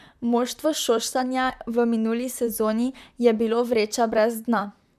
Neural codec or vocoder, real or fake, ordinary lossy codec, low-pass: vocoder, 44.1 kHz, 128 mel bands, Pupu-Vocoder; fake; MP3, 96 kbps; 14.4 kHz